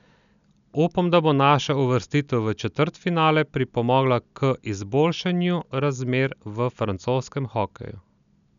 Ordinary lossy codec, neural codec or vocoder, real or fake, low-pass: none; none; real; 7.2 kHz